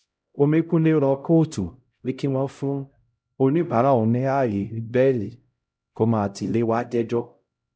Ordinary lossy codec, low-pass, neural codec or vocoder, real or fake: none; none; codec, 16 kHz, 0.5 kbps, X-Codec, HuBERT features, trained on LibriSpeech; fake